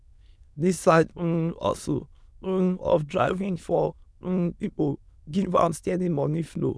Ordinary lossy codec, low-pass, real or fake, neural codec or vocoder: none; none; fake; autoencoder, 22.05 kHz, a latent of 192 numbers a frame, VITS, trained on many speakers